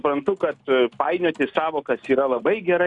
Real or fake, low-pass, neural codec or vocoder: real; 10.8 kHz; none